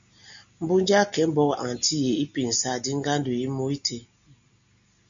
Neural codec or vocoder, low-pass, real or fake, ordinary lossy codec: none; 7.2 kHz; real; MP3, 64 kbps